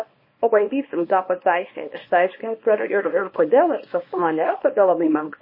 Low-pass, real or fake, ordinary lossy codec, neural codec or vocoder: 5.4 kHz; fake; MP3, 24 kbps; codec, 24 kHz, 0.9 kbps, WavTokenizer, small release